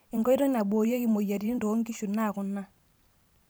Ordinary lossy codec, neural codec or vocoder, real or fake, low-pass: none; vocoder, 44.1 kHz, 128 mel bands every 512 samples, BigVGAN v2; fake; none